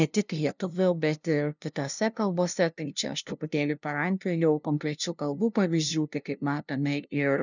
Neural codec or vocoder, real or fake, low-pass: codec, 16 kHz, 0.5 kbps, FunCodec, trained on LibriTTS, 25 frames a second; fake; 7.2 kHz